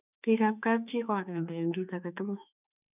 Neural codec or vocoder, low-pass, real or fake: autoencoder, 48 kHz, 32 numbers a frame, DAC-VAE, trained on Japanese speech; 3.6 kHz; fake